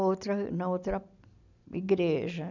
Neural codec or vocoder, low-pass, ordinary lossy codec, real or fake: none; 7.2 kHz; none; real